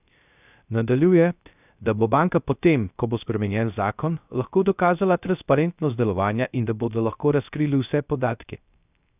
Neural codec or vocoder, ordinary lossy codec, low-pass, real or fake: codec, 16 kHz, 0.3 kbps, FocalCodec; none; 3.6 kHz; fake